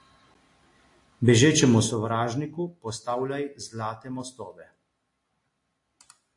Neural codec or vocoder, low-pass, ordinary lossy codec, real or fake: none; 10.8 kHz; AAC, 48 kbps; real